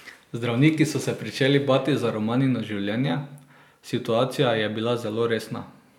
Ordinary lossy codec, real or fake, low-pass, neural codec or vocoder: none; real; 19.8 kHz; none